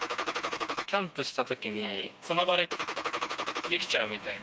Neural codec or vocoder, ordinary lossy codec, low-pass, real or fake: codec, 16 kHz, 2 kbps, FreqCodec, smaller model; none; none; fake